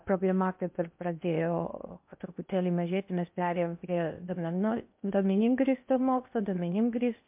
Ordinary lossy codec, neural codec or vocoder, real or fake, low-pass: MP3, 32 kbps; codec, 16 kHz in and 24 kHz out, 0.8 kbps, FocalCodec, streaming, 65536 codes; fake; 3.6 kHz